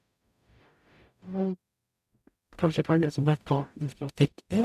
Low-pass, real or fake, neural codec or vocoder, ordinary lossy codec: 14.4 kHz; fake; codec, 44.1 kHz, 0.9 kbps, DAC; none